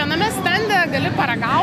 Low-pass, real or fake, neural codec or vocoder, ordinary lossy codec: 14.4 kHz; real; none; AAC, 96 kbps